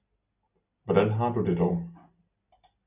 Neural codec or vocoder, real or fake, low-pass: none; real; 3.6 kHz